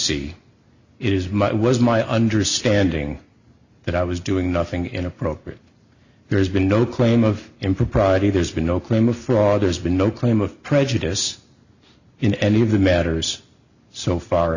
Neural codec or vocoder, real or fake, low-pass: none; real; 7.2 kHz